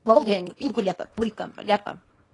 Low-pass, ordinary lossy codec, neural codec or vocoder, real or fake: 10.8 kHz; AAC, 32 kbps; codec, 24 kHz, 0.9 kbps, WavTokenizer, small release; fake